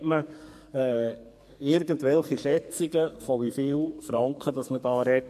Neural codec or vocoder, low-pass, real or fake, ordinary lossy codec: codec, 44.1 kHz, 2.6 kbps, SNAC; 14.4 kHz; fake; MP3, 64 kbps